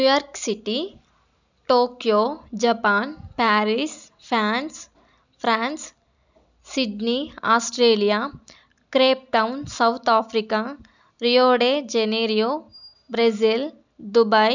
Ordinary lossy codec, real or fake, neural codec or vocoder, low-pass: none; real; none; 7.2 kHz